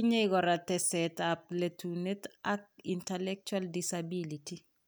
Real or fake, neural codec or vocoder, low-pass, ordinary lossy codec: real; none; none; none